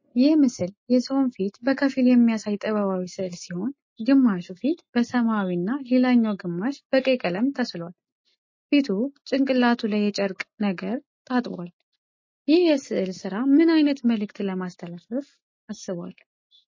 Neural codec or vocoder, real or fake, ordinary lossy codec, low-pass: none; real; MP3, 32 kbps; 7.2 kHz